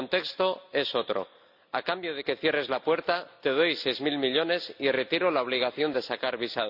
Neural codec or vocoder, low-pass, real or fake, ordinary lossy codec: none; 5.4 kHz; real; none